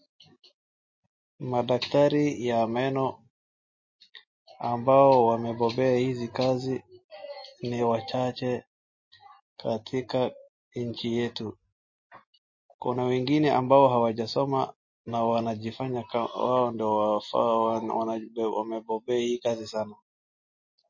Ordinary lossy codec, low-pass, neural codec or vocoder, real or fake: MP3, 32 kbps; 7.2 kHz; none; real